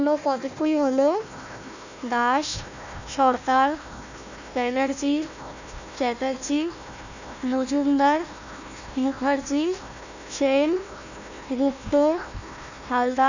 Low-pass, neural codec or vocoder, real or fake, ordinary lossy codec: 7.2 kHz; codec, 16 kHz, 1 kbps, FunCodec, trained on Chinese and English, 50 frames a second; fake; none